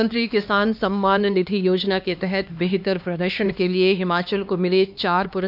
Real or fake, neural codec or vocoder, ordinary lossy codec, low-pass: fake; codec, 16 kHz, 2 kbps, X-Codec, HuBERT features, trained on LibriSpeech; none; 5.4 kHz